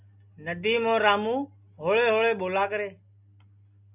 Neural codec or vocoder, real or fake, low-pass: none; real; 3.6 kHz